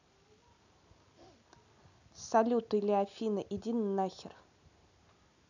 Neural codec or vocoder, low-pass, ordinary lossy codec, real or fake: none; 7.2 kHz; none; real